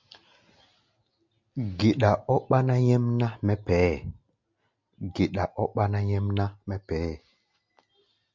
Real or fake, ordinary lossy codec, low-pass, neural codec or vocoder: real; MP3, 48 kbps; 7.2 kHz; none